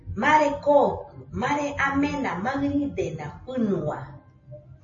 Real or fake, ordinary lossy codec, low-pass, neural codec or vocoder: real; MP3, 32 kbps; 7.2 kHz; none